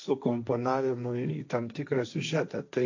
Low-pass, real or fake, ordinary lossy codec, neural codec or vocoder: 7.2 kHz; fake; AAC, 48 kbps; codec, 16 kHz, 1.1 kbps, Voila-Tokenizer